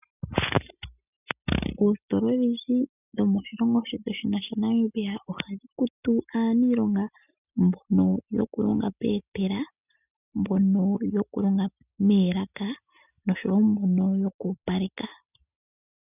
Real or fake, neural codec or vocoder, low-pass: real; none; 3.6 kHz